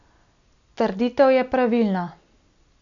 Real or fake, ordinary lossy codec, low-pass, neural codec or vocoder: real; none; 7.2 kHz; none